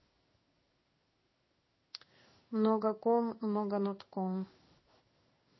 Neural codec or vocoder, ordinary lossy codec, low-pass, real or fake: codec, 16 kHz in and 24 kHz out, 1 kbps, XY-Tokenizer; MP3, 24 kbps; 7.2 kHz; fake